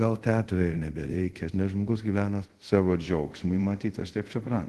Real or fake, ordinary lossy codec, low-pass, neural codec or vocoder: fake; Opus, 16 kbps; 10.8 kHz; codec, 24 kHz, 0.5 kbps, DualCodec